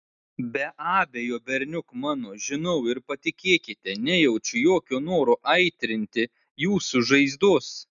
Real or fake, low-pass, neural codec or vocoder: real; 7.2 kHz; none